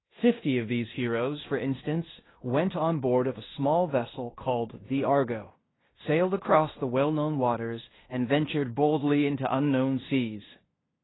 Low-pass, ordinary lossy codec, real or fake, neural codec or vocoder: 7.2 kHz; AAC, 16 kbps; fake; codec, 16 kHz in and 24 kHz out, 0.9 kbps, LongCat-Audio-Codec, fine tuned four codebook decoder